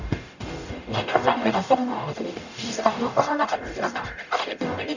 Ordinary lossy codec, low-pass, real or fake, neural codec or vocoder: none; 7.2 kHz; fake; codec, 44.1 kHz, 0.9 kbps, DAC